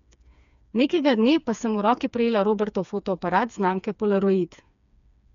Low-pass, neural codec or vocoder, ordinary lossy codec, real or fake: 7.2 kHz; codec, 16 kHz, 4 kbps, FreqCodec, smaller model; none; fake